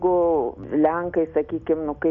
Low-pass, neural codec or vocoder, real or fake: 7.2 kHz; none; real